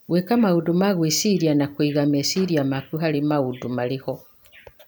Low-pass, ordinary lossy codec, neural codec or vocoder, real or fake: none; none; none; real